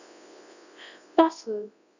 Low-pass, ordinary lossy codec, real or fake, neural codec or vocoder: 7.2 kHz; none; fake; codec, 24 kHz, 0.9 kbps, WavTokenizer, large speech release